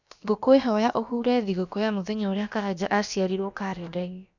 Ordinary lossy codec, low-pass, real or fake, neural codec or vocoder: none; 7.2 kHz; fake; codec, 16 kHz, about 1 kbps, DyCAST, with the encoder's durations